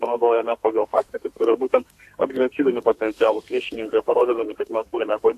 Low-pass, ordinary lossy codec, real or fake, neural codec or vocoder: 14.4 kHz; AAC, 64 kbps; fake; codec, 32 kHz, 1.9 kbps, SNAC